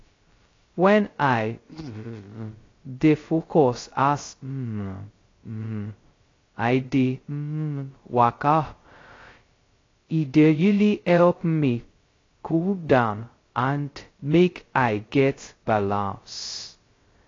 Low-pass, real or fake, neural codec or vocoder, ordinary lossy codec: 7.2 kHz; fake; codec, 16 kHz, 0.2 kbps, FocalCodec; AAC, 32 kbps